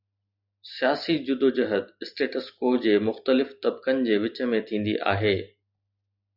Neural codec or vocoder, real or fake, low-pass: none; real; 5.4 kHz